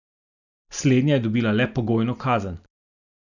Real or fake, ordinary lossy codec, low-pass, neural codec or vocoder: real; none; 7.2 kHz; none